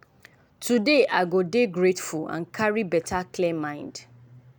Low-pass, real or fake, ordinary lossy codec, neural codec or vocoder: none; real; none; none